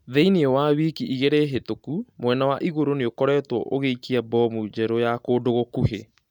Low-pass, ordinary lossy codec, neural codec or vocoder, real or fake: 19.8 kHz; none; none; real